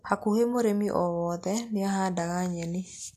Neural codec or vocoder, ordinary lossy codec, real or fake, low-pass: none; AAC, 64 kbps; real; 14.4 kHz